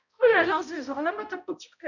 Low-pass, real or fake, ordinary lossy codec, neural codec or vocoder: 7.2 kHz; fake; none; codec, 16 kHz, 0.5 kbps, X-Codec, HuBERT features, trained on balanced general audio